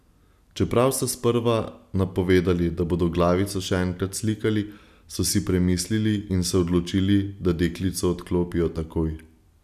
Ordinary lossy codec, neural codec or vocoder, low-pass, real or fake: none; none; 14.4 kHz; real